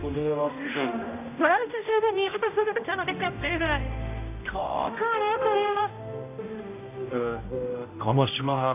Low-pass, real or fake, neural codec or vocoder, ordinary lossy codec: 3.6 kHz; fake; codec, 16 kHz, 0.5 kbps, X-Codec, HuBERT features, trained on general audio; none